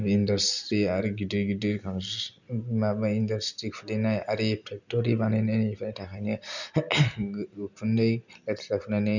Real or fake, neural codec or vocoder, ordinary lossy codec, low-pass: real; none; none; 7.2 kHz